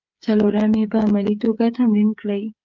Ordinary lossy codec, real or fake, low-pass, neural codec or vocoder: Opus, 24 kbps; fake; 7.2 kHz; codec, 16 kHz, 8 kbps, FreqCodec, smaller model